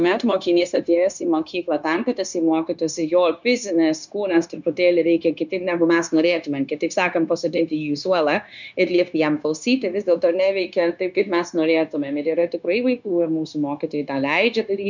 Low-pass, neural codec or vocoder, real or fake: 7.2 kHz; codec, 16 kHz, 0.9 kbps, LongCat-Audio-Codec; fake